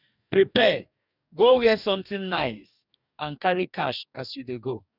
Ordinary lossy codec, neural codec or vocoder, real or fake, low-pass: none; codec, 44.1 kHz, 2.6 kbps, DAC; fake; 5.4 kHz